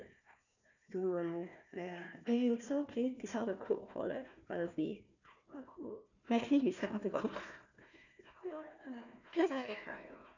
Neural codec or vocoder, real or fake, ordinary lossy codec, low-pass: codec, 16 kHz, 1 kbps, FunCodec, trained on Chinese and English, 50 frames a second; fake; none; 7.2 kHz